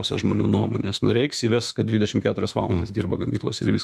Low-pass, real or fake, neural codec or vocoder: 14.4 kHz; fake; autoencoder, 48 kHz, 32 numbers a frame, DAC-VAE, trained on Japanese speech